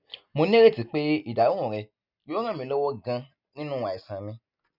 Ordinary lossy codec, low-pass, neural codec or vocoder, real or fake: none; 5.4 kHz; none; real